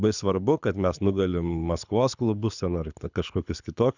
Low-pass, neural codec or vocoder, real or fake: 7.2 kHz; codec, 24 kHz, 6 kbps, HILCodec; fake